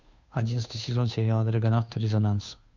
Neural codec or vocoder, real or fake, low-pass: codec, 16 kHz, 2 kbps, FunCodec, trained on Chinese and English, 25 frames a second; fake; 7.2 kHz